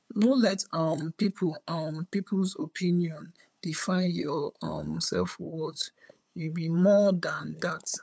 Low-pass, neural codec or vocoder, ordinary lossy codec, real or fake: none; codec, 16 kHz, 8 kbps, FunCodec, trained on LibriTTS, 25 frames a second; none; fake